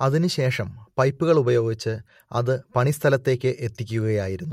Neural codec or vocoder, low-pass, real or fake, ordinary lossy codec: none; 19.8 kHz; real; MP3, 64 kbps